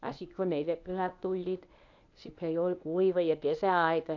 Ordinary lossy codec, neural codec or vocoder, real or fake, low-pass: AAC, 48 kbps; codec, 24 kHz, 0.9 kbps, WavTokenizer, medium speech release version 1; fake; 7.2 kHz